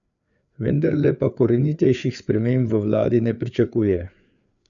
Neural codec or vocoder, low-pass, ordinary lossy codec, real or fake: codec, 16 kHz, 4 kbps, FreqCodec, larger model; 7.2 kHz; none; fake